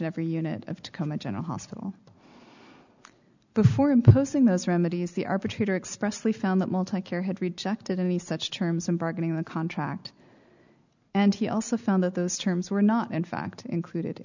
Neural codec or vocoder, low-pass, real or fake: none; 7.2 kHz; real